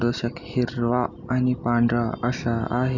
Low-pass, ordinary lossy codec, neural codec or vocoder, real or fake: 7.2 kHz; none; none; real